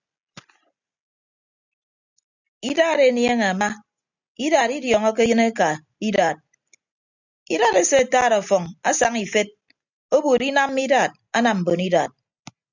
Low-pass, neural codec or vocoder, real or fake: 7.2 kHz; none; real